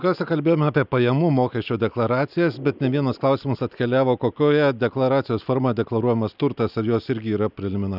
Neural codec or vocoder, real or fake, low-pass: none; real; 5.4 kHz